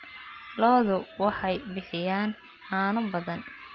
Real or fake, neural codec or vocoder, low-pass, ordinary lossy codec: real; none; 7.2 kHz; Opus, 24 kbps